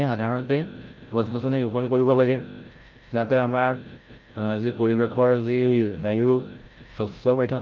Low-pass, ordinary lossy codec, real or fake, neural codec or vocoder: 7.2 kHz; Opus, 32 kbps; fake; codec, 16 kHz, 0.5 kbps, FreqCodec, larger model